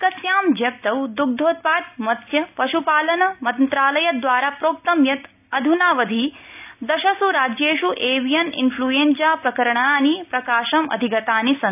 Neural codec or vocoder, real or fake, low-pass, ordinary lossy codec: none; real; 3.6 kHz; none